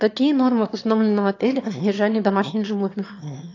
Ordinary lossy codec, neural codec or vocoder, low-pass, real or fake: AAC, 48 kbps; autoencoder, 22.05 kHz, a latent of 192 numbers a frame, VITS, trained on one speaker; 7.2 kHz; fake